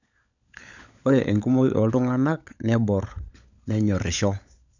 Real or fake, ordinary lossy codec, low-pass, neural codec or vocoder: fake; none; 7.2 kHz; codec, 16 kHz, 16 kbps, FunCodec, trained on LibriTTS, 50 frames a second